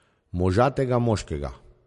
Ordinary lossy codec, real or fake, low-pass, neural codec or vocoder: MP3, 48 kbps; real; 14.4 kHz; none